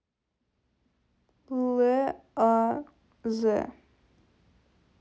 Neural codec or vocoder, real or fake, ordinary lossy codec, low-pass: none; real; none; none